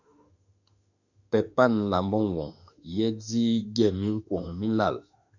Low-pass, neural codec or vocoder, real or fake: 7.2 kHz; autoencoder, 48 kHz, 32 numbers a frame, DAC-VAE, trained on Japanese speech; fake